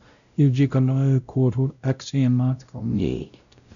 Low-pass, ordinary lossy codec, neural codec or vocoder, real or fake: 7.2 kHz; none; codec, 16 kHz, 0.5 kbps, X-Codec, WavLM features, trained on Multilingual LibriSpeech; fake